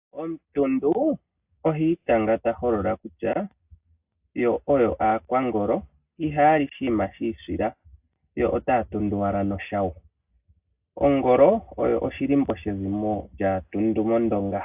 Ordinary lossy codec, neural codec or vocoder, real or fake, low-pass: AAC, 32 kbps; none; real; 3.6 kHz